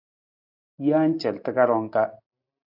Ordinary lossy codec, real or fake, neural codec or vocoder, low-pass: AAC, 48 kbps; real; none; 5.4 kHz